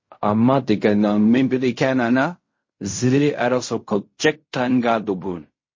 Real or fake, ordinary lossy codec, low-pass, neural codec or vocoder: fake; MP3, 32 kbps; 7.2 kHz; codec, 16 kHz in and 24 kHz out, 0.4 kbps, LongCat-Audio-Codec, fine tuned four codebook decoder